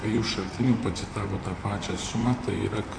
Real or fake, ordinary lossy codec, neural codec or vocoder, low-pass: fake; AAC, 48 kbps; vocoder, 44.1 kHz, 128 mel bands, Pupu-Vocoder; 9.9 kHz